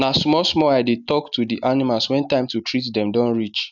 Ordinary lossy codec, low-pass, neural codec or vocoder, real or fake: none; 7.2 kHz; none; real